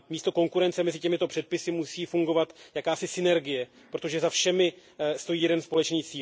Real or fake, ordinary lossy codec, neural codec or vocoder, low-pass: real; none; none; none